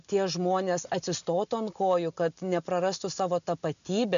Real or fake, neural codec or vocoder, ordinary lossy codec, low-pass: real; none; MP3, 96 kbps; 7.2 kHz